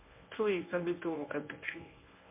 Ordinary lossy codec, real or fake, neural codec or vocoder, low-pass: MP3, 24 kbps; fake; codec, 24 kHz, 0.9 kbps, WavTokenizer, medium speech release version 1; 3.6 kHz